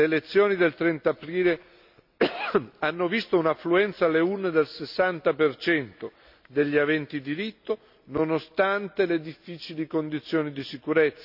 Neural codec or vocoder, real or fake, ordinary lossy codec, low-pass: none; real; none; 5.4 kHz